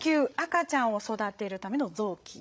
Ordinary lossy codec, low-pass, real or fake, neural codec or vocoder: none; none; fake; codec, 16 kHz, 16 kbps, FreqCodec, larger model